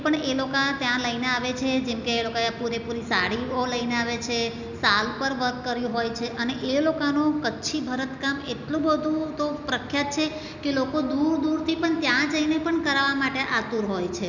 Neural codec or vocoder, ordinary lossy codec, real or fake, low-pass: none; none; real; 7.2 kHz